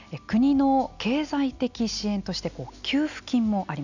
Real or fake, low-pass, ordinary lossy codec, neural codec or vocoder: real; 7.2 kHz; none; none